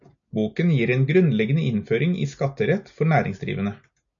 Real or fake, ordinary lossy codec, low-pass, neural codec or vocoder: real; MP3, 96 kbps; 7.2 kHz; none